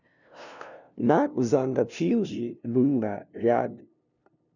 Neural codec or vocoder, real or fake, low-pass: codec, 16 kHz, 0.5 kbps, FunCodec, trained on LibriTTS, 25 frames a second; fake; 7.2 kHz